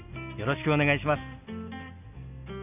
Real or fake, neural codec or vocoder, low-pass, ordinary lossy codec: real; none; 3.6 kHz; none